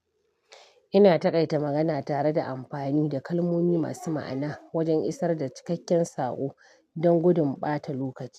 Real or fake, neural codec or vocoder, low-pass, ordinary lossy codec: real; none; 14.4 kHz; none